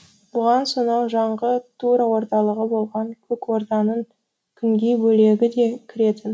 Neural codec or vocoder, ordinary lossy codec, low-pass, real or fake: none; none; none; real